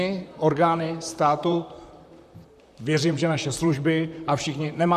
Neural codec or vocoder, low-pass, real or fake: vocoder, 44.1 kHz, 128 mel bands, Pupu-Vocoder; 14.4 kHz; fake